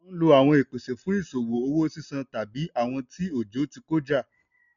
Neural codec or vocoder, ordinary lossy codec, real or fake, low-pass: none; none; real; 7.2 kHz